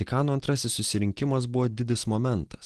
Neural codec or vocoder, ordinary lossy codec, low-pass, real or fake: none; Opus, 16 kbps; 10.8 kHz; real